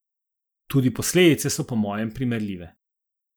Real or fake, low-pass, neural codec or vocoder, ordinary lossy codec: real; none; none; none